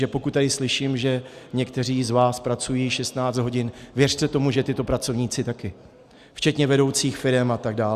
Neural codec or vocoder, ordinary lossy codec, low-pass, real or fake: none; Opus, 64 kbps; 14.4 kHz; real